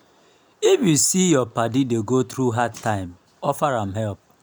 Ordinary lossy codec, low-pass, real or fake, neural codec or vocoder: none; none; real; none